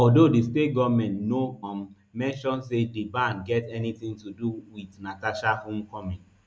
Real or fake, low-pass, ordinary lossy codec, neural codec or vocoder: real; none; none; none